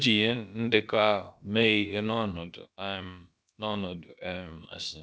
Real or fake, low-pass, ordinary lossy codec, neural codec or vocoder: fake; none; none; codec, 16 kHz, about 1 kbps, DyCAST, with the encoder's durations